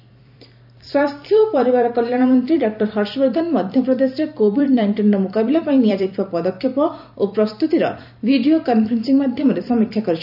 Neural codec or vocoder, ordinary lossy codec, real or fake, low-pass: vocoder, 44.1 kHz, 128 mel bands every 256 samples, BigVGAN v2; none; fake; 5.4 kHz